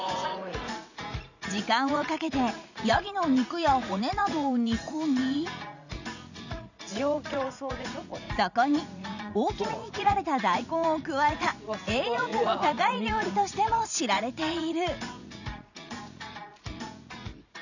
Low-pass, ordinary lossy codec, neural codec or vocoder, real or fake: 7.2 kHz; none; vocoder, 44.1 kHz, 80 mel bands, Vocos; fake